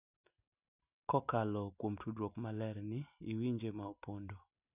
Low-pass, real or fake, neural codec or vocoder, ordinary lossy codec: 3.6 kHz; real; none; none